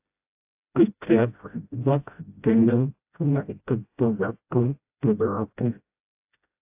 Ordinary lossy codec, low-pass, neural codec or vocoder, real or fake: AAC, 32 kbps; 3.6 kHz; codec, 16 kHz, 0.5 kbps, FreqCodec, smaller model; fake